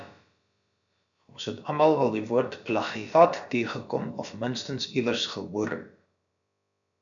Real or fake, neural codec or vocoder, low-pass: fake; codec, 16 kHz, about 1 kbps, DyCAST, with the encoder's durations; 7.2 kHz